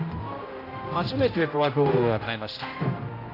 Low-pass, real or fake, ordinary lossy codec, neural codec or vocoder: 5.4 kHz; fake; AAC, 32 kbps; codec, 16 kHz, 0.5 kbps, X-Codec, HuBERT features, trained on general audio